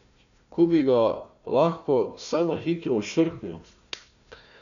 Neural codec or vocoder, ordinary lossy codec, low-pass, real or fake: codec, 16 kHz, 1 kbps, FunCodec, trained on Chinese and English, 50 frames a second; none; 7.2 kHz; fake